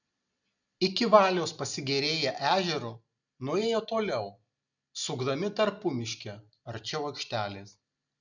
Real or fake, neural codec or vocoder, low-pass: real; none; 7.2 kHz